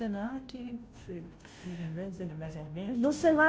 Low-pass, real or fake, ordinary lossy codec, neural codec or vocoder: none; fake; none; codec, 16 kHz, 0.5 kbps, FunCodec, trained on Chinese and English, 25 frames a second